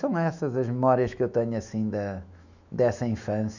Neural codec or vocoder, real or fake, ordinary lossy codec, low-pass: autoencoder, 48 kHz, 128 numbers a frame, DAC-VAE, trained on Japanese speech; fake; none; 7.2 kHz